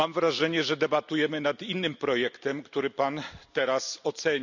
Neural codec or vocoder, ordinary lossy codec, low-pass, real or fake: none; none; 7.2 kHz; real